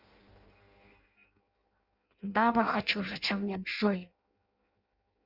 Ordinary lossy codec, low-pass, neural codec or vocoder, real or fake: Opus, 64 kbps; 5.4 kHz; codec, 16 kHz in and 24 kHz out, 0.6 kbps, FireRedTTS-2 codec; fake